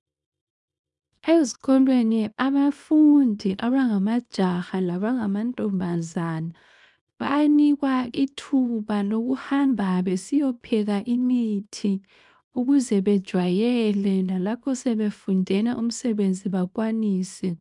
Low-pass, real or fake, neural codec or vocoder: 10.8 kHz; fake; codec, 24 kHz, 0.9 kbps, WavTokenizer, small release